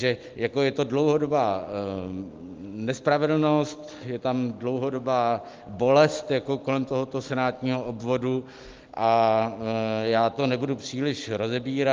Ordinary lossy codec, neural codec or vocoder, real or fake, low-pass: Opus, 32 kbps; none; real; 7.2 kHz